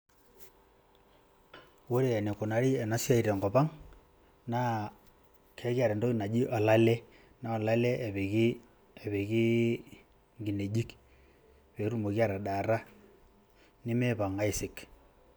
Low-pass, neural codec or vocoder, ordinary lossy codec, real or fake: none; none; none; real